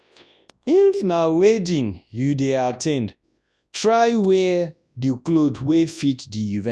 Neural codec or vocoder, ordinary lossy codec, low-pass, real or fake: codec, 24 kHz, 0.9 kbps, WavTokenizer, large speech release; none; none; fake